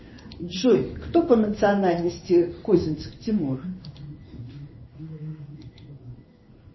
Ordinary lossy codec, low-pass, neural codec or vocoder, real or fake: MP3, 24 kbps; 7.2 kHz; codec, 16 kHz in and 24 kHz out, 1 kbps, XY-Tokenizer; fake